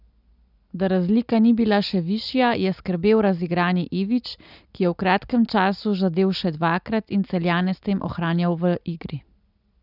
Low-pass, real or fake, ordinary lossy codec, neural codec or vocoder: 5.4 kHz; real; none; none